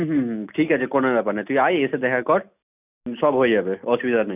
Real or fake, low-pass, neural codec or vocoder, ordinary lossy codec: real; 3.6 kHz; none; none